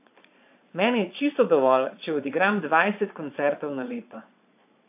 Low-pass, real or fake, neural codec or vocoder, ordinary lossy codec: 3.6 kHz; fake; vocoder, 22.05 kHz, 80 mel bands, Vocos; AAC, 32 kbps